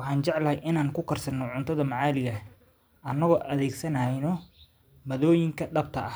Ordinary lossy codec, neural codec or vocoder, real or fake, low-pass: none; vocoder, 44.1 kHz, 128 mel bands every 512 samples, BigVGAN v2; fake; none